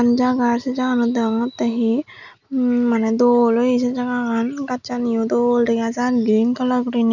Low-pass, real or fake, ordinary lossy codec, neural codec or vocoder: 7.2 kHz; real; none; none